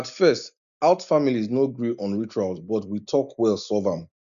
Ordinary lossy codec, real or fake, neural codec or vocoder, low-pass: none; real; none; 7.2 kHz